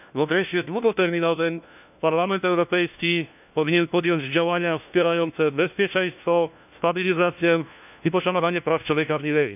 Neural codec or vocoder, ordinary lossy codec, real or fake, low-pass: codec, 16 kHz, 1 kbps, FunCodec, trained on LibriTTS, 50 frames a second; none; fake; 3.6 kHz